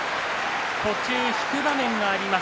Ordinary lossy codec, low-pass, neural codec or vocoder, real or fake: none; none; none; real